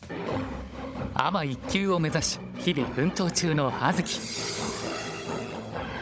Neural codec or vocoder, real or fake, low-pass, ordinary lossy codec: codec, 16 kHz, 16 kbps, FunCodec, trained on Chinese and English, 50 frames a second; fake; none; none